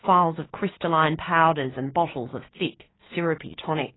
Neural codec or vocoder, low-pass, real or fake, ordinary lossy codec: codec, 16 kHz, 6 kbps, DAC; 7.2 kHz; fake; AAC, 16 kbps